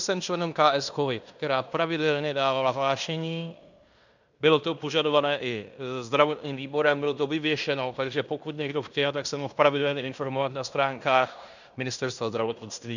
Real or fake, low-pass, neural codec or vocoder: fake; 7.2 kHz; codec, 16 kHz in and 24 kHz out, 0.9 kbps, LongCat-Audio-Codec, fine tuned four codebook decoder